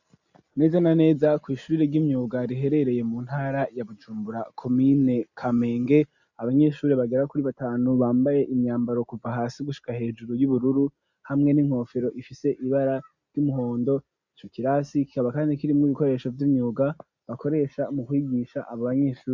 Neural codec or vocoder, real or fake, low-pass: none; real; 7.2 kHz